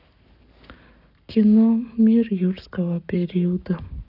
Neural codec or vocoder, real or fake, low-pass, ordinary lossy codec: codec, 44.1 kHz, 7.8 kbps, Pupu-Codec; fake; 5.4 kHz; none